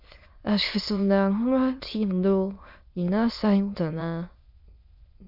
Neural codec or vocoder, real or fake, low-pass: autoencoder, 22.05 kHz, a latent of 192 numbers a frame, VITS, trained on many speakers; fake; 5.4 kHz